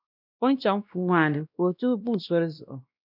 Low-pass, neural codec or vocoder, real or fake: 5.4 kHz; codec, 16 kHz, 1 kbps, X-Codec, WavLM features, trained on Multilingual LibriSpeech; fake